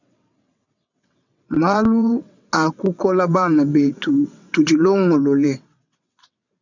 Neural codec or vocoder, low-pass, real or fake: vocoder, 22.05 kHz, 80 mel bands, WaveNeXt; 7.2 kHz; fake